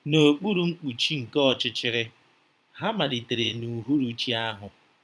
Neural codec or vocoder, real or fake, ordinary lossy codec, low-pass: vocoder, 22.05 kHz, 80 mel bands, Vocos; fake; none; none